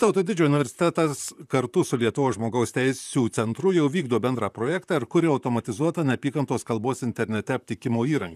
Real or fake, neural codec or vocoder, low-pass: fake; vocoder, 44.1 kHz, 128 mel bands, Pupu-Vocoder; 14.4 kHz